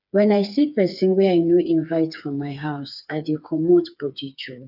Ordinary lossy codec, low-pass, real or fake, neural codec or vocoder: none; 5.4 kHz; fake; codec, 16 kHz, 4 kbps, FreqCodec, smaller model